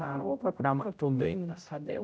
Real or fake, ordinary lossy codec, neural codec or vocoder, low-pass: fake; none; codec, 16 kHz, 0.5 kbps, X-Codec, HuBERT features, trained on general audio; none